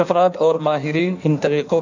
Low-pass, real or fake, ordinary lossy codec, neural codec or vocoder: 7.2 kHz; fake; none; codec, 16 kHz in and 24 kHz out, 1.1 kbps, FireRedTTS-2 codec